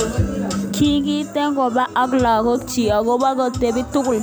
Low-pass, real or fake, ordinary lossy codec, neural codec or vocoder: none; real; none; none